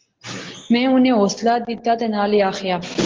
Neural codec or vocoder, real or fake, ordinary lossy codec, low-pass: none; real; Opus, 16 kbps; 7.2 kHz